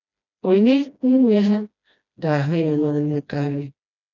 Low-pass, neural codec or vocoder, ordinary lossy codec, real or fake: 7.2 kHz; codec, 16 kHz, 1 kbps, FreqCodec, smaller model; none; fake